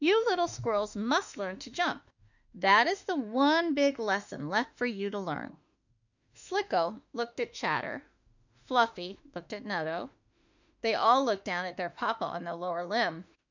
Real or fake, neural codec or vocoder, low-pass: fake; autoencoder, 48 kHz, 32 numbers a frame, DAC-VAE, trained on Japanese speech; 7.2 kHz